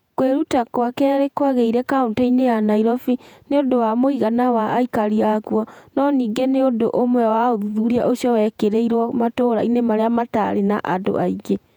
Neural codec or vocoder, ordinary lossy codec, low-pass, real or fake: vocoder, 48 kHz, 128 mel bands, Vocos; none; 19.8 kHz; fake